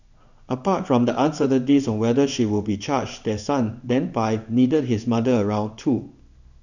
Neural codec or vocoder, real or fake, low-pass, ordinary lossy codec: codec, 16 kHz in and 24 kHz out, 1 kbps, XY-Tokenizer; fake; 7.2 kHz; none